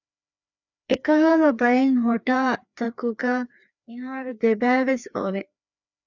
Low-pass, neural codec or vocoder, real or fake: 7.2 kHz; codec, 16 kHz, 2 kbps, FreqCodec, larger model; fake